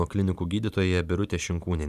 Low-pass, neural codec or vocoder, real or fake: 14.4 kHz; vocoder, 44.1 kHz, 128 mel bands every 512 samples, BigVGAN v2; fake